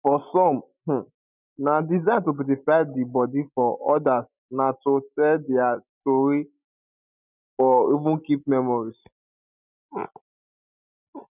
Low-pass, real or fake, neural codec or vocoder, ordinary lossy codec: 3.6 kHz; real; none; none